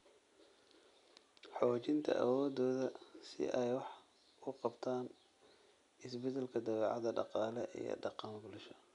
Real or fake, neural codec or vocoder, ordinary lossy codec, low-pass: real; none; none; 10.8 kHz